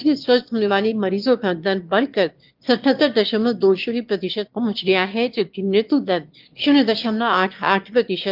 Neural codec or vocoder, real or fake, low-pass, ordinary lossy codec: autoencoder, 22.05 kHz, a latent of 192 numbers a frame, VITS, trained on one speaker; fake; 5.4 kHz; Opus, 32 kbps